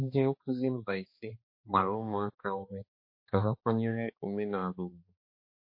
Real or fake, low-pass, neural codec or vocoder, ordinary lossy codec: fake; 5.4 kHz; codec, 16 kHz, 2 kbps, X-Codec, HuBERT features, trained on balanced general audio; MP3, 32 kbps